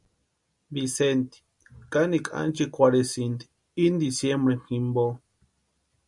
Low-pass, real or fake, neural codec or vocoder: 10.8 kHz; real; none